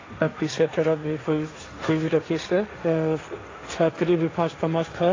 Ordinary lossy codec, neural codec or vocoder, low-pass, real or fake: AAC, 32 kbps; codec, 16 kHz, 1.1 kbps, Voila-Tokenizer; 7.2 kHz; fake